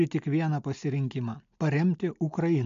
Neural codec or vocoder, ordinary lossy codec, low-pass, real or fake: none; MP3, 64 kbps; 7.2 kHz; real